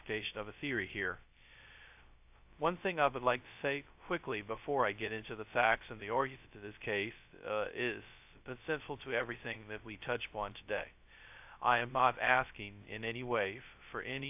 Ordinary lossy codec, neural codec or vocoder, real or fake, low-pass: AAC, 32 kbps; codec, 16 kHz, 0.2 kbps, FocalCodec; fake; 3.6 kHz